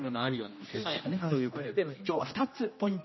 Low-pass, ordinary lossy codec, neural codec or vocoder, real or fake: 7.2 kHz; MP3, 24 kbps; codec, 16 kHz, 1 kbps, X-Codec, HuBERT features, trained on general audio; fake